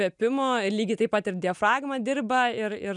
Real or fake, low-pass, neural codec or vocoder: real; 10.8 kHz; none